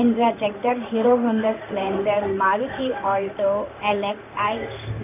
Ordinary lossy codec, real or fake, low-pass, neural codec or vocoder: none; fake; 3.6 kHz; codec, 16 kHz in and 24 kHz out, 1 kbps, XY-Tokenizer